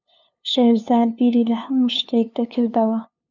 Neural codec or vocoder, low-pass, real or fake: codec, 16 kHz, 2 kbps, FunCodec, trained on LibriTTS, 25 frames a second; 7.2 kHz; fake